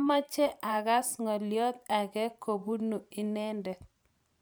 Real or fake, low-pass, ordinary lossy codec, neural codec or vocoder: fake; none; none; vocoder, 44.1 kHz, 128 mel bands every 512 samples, BigVGAN v2